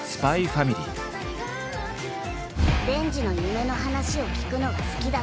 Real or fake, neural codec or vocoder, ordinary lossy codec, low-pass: real; none; none; none